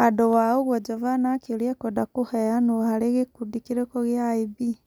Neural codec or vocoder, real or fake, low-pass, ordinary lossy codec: none; real; none; none